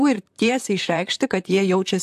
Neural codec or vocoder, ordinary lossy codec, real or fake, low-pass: vocoder, 44.1 kHz, 128 mel bands, Pupu-Vocoder; AAC, 96 kbps; fake; 14.4 kHz